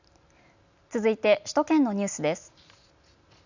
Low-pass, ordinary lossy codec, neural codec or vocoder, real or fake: 7.2 kHz; none; none; real